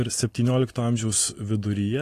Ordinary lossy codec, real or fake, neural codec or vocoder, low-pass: AAC, 48 kbps; real; none; 14.4 kHz